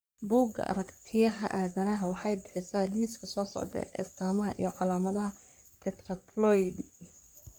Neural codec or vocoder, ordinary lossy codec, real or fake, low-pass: codec, 44.1 kHz, 3.4 kbps, Pupu-Codec; none; fake; none